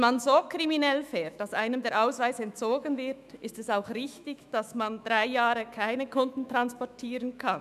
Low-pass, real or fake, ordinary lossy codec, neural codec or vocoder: 14.4 kHz; fake; none; autoencoder, 48 kHz, 128 numbers a frame, DAC-VAE, trained on Japanese speech